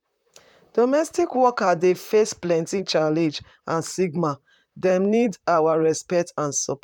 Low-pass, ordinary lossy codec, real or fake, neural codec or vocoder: 19.8 kHz; none; fake; vocoder, 44.1 kHz, 128 mel bands, Pupu-Vocoder